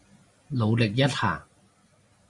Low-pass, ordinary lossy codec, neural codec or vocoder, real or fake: 10.8 kHz; Opus, 64 kbps; none; real